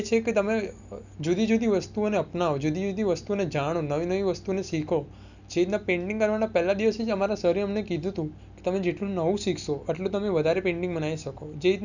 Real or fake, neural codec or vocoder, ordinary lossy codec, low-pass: real; none; none; 7.2 kHz